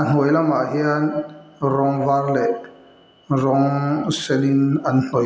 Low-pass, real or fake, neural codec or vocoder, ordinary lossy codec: none; real; none; none